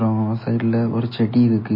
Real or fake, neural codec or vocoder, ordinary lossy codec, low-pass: real; none; MP3, 24 kbps; 5.4 kHz